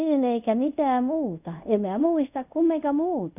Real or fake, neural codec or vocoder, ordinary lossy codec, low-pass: fake; codec, 24 kHz, 0.5 kbps, DualCodec; none; 3.6 kHz